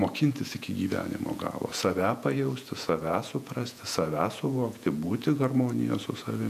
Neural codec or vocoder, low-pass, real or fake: vocoder, 48 kHz, 128 mel bands, Vocos; 14.4 kHz; fake